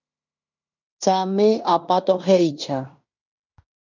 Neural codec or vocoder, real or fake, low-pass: codec, 16 kHz in and 24 kHz out, 0.9 kbps, LongCat-Audio-Codec, fine tuned four codebook decoder; fake; 7.2 kHz